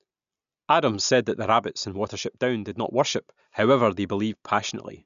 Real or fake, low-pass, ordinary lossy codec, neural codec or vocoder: real; 7.2 kHz; none; none